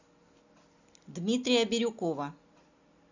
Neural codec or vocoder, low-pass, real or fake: none; 7.2 kHz; real